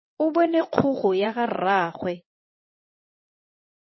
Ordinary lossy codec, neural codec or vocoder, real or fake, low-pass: MP3, 24 kbps; vocoder, 22.05 kHz, 80 mel bands, WaveNeXt; fake; 7.2 kHz